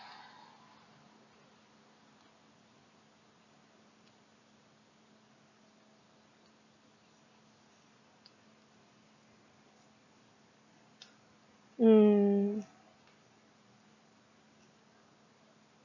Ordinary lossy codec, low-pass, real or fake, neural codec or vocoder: none; 7.2 kHz; real; none